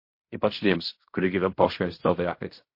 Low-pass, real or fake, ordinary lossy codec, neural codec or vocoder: 5.4 kHz; fake; MP3, 32 kbps; codec, 16 kHz in and 24 kHz out, 0.4 kbps, LongCat-Audio-Codec, fine tuned four codebook decoder